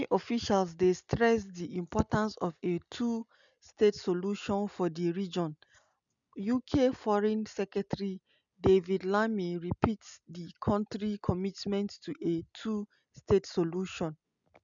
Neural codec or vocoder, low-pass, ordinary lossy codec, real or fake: none; 7.2 kHz; none; real